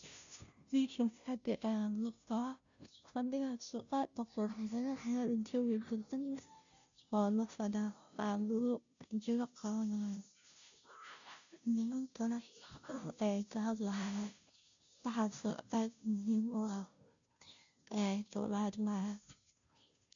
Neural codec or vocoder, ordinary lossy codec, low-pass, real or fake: codec, 16 kHz, 0.5 kbps, FunCodec, trained on Chinese and English, 25 frames a second; none; 7.2 kHz; fake